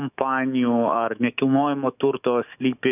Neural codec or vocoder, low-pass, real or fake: autoencoder, 48 kHz, 128 numbers a frame, DAC-VAE, trained on Japanese speech; 3.6 kHz; fake